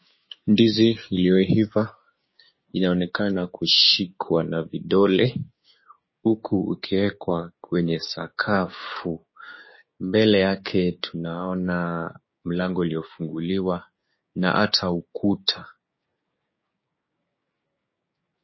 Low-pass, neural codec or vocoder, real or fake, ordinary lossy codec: 7.2 kHz; codec, 16 kHz, 6 kbps, DAC; fake; MP3, 24 kbps